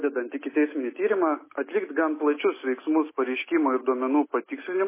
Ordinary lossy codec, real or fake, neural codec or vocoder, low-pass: MP3, 16 kbps; real; none; 3.6 kHz